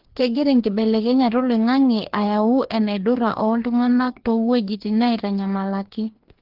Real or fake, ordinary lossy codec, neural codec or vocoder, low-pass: fake; Opus, 16 kbps; codec, 16 kHz, 2 kbps, FreqCodec, larger model; 5.4 kHz